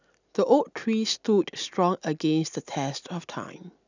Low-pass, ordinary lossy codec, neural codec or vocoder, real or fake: 7.2 kHz; none; none; real